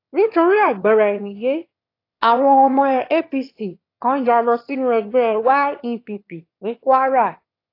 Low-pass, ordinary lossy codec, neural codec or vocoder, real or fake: 5.4 kHz; AAC, 32 kbps; autoencoder, 22.05 kHz, a latent of 192 numbers a frame, VITS, trained on one speaker; fake